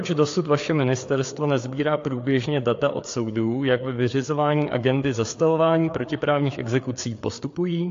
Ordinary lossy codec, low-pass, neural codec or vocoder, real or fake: AAC, 48 kbps; 7.2 kHz; codec, 16 kHz, 4 kbps, FreqCodec, larger model; fake